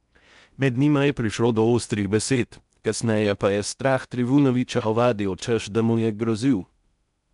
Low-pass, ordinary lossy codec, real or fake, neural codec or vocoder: 10.8 kHz; none; fake; codec, 16 kHz in and 24 kHz out, 0.6 kbps, FocalCodec, streaming, 4096 codes